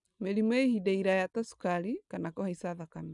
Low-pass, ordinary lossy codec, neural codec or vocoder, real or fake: 10.8 kHz; none; none; real